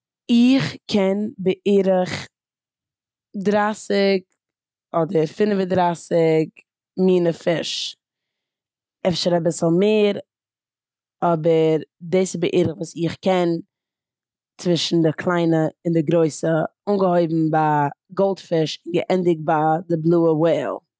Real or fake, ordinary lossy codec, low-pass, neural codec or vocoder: real; none; none; none